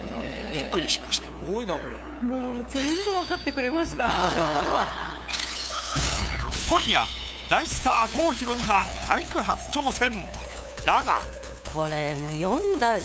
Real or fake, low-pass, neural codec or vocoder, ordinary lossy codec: fake; none; codec, 16 kHz, 2 kbps, FunCodec, trained on LibriTTS, 25 frames a second; none